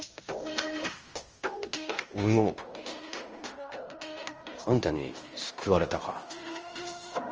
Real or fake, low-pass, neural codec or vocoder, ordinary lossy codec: fake; 7.2 kHz; codec, 16 kHz in and 24 kHz out, 0.9 kbps, LongCat-Audio-Codec, fine tuned four codebook decoder; Opus, 24 kbps